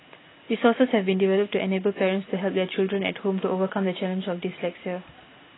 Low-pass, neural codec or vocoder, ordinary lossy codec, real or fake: 7.2 kHz; none; AAC, 16 kbps; real